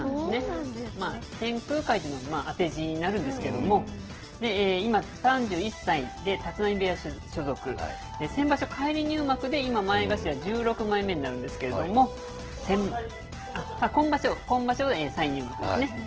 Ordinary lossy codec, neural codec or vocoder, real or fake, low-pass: Opus, 16 kbps; none; real; 7.2 kHz